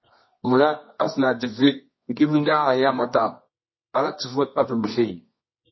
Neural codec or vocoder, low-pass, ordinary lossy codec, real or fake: codec, 24 kHz, 0.9 kbps, WavTokenizer, medium music audio release; 7.2 kHz; MP3, 24 kbps; fake